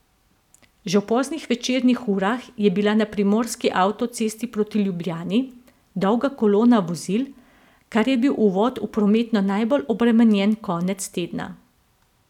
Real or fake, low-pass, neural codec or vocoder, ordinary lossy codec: real; 19.8 kHz; none; none